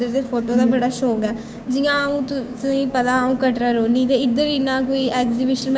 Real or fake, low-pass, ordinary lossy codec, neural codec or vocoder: fake; none; none; codec, 16 kHz, 6 kbps, DAC